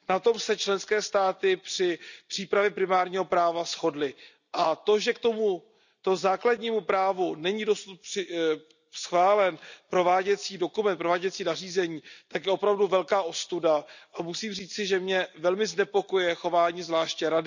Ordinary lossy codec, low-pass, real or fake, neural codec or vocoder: none; 7.2 kHz; real; none